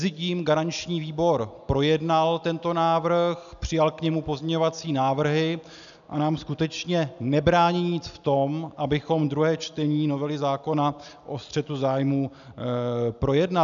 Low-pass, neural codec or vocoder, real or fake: 7.2 kHz; none; real